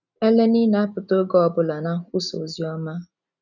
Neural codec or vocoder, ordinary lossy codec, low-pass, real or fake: none; none; 7.2 kHz; real